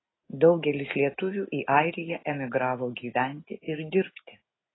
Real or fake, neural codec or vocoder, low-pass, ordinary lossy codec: real; none; 7.2 kHz; AAC, 16 kbps